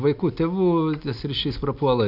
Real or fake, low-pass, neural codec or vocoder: real; 5.4 kHz; none